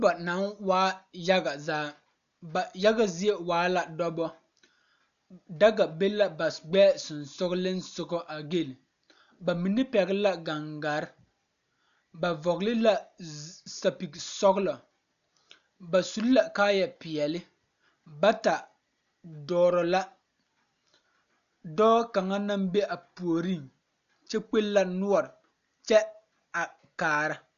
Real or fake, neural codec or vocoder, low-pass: real; none; 7.2 kHz